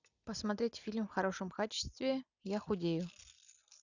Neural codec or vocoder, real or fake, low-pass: none; real; 7.2 kHz